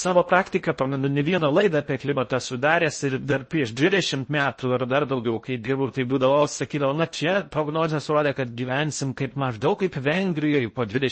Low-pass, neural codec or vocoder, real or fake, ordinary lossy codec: 9.9 kHz; codec, 16 kHz in and 24 kHz out, 0.6 kbps, FocalCodec, streaming, 2048 codes; fake; MP3, 32 kbps